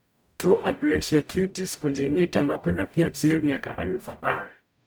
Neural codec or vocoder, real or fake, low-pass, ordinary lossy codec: codec, 44.1 kHz, 0.9 kbps, DAC; fake; none; none